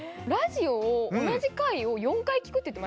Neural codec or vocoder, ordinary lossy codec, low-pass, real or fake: none; none; none; real